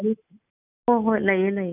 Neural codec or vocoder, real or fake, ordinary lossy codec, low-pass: none; real; none; 3.6 kHz